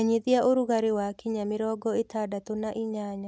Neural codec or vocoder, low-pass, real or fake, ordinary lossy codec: none; none; real; none